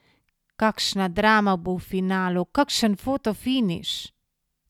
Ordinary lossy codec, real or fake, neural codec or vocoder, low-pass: none; real; none; 19.8 kHz